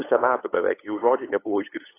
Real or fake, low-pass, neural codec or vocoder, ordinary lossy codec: fake; 3.6 kHz; codec, 16 kHz, 8 kbps, FunCodec, trained on LibriTTS, 25 frames a second; AAC, 16 kbps